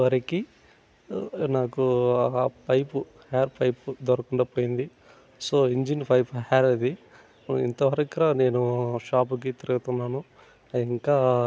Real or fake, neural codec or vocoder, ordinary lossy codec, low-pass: real; none; none; none